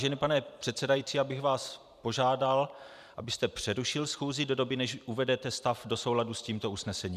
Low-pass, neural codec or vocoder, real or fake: 14.4 kHz; vocoder, 44.1 kHz, 128 mel bands every 256 samples, BigVGAN v2; fake